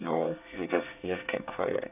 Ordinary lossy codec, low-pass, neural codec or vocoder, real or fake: none; 3.6 kHz; codec, 24 kHz, 1 kbps, SNAC; fake